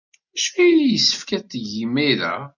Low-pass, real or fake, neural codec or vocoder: 7.2 kHz; real; none